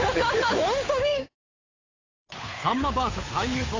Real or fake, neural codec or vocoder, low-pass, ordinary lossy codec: fake; codec, 16 kHz, 8 kbps, FunCodec, trained on Chinese and English, 25 frames a second; 7.2 kHz; AAC, 32 kbps